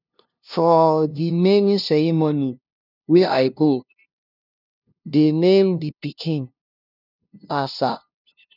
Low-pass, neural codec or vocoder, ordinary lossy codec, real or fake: 5.4 kHz; codec, 16 kHz, 0.5 kbps, FunCodec, trained on LibriTTS, 25 frames a second; none; fake